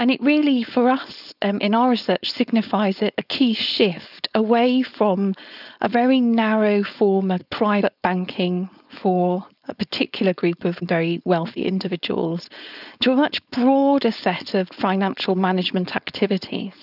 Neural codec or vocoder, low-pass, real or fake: codec, 16 kHz, 4.8 kbps, FACodec; 5.4 kHz; fake